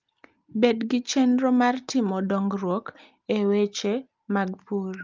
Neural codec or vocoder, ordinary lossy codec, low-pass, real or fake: none; Opus, 24 kbps; 7.2 kHz; real